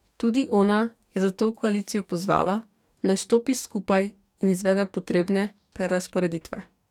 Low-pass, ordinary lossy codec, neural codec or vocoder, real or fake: 19.8 kHz; none; codec, 44.1 kHz, 2.6 kbps, DAC; fake